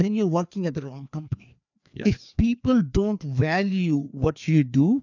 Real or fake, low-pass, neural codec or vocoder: fake; 7.2 kHz; codec, 16 kHz, 2 kbps, FreqCodec, larger model